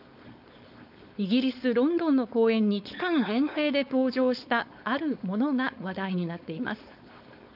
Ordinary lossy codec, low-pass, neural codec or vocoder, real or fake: none; 5.4 kHz; codec, 16 kHz, 4.8 kbps, FACodec; fake